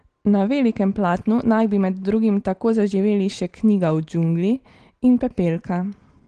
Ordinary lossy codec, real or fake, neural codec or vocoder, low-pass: Opus, 16 kbps; real; none; 9.9 kHz